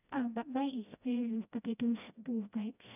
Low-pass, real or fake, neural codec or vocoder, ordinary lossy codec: 3.6 kHz; fake; codec, 16 kHz, 1 kbps, FreqCodec, smaller model; none